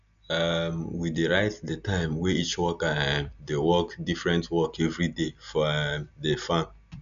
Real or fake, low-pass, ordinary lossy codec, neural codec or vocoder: real; 7.2 kHz; none; none